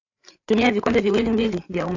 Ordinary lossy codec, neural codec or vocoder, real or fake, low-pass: AAC, 48 kbps; codec, 16 kHz, 8 kbps, FreqCodec, larger model; fake; 7.2 kHz